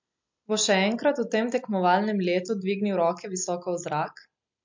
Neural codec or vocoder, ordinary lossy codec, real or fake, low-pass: none; MP3, 48 kbps; real; 7.2 kHz